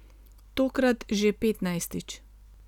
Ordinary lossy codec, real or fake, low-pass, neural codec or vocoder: none; real; 19.8 kHz; none